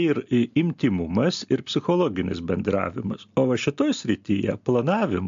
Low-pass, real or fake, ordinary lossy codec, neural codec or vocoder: 7.2 kHz; real; AAC, 64 kbps; none